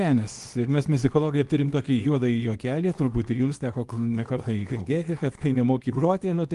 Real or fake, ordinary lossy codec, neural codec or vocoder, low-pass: fake; Opus, 24 kbps; codec, 24 kHz, 0.9 kbps, WavTokenizer, small release; 10.8 kHz